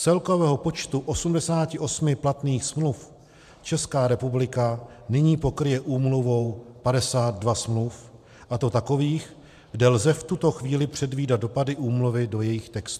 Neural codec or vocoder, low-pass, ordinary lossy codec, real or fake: vocoder, 44.1 kHz, 128 mel bands every 512 samples, BigVGAN v2; 14.4 kHz; MP3, 96 kbps; fake